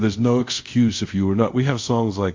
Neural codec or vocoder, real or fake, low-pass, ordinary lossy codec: codec, 24 kHz, 0.5 kbps, DualCodec; fake; 7.2 kHz; MP3, 48 kbps